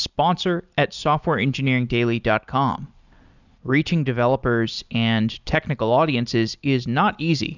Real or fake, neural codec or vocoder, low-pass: real; none; 7.2 kHz